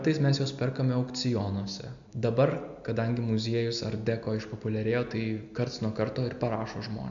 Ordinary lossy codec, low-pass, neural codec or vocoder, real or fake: AAC, 64 kbps; 7.2 kHz; none; real